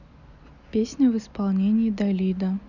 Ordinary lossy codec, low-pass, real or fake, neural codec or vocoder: none; 7.2 kHz; real; none